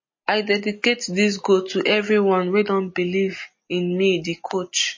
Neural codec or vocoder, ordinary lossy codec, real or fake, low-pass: none; MP3, 32 kbps; real; 7.2 kHz